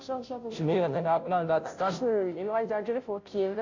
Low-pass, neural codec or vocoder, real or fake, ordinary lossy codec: 7.2 kHz; codec, 16 kHz, 0.5 kbps, FunCodec, trained on Chinese and English, 25 frames a second; fake; AAC, 48 kbps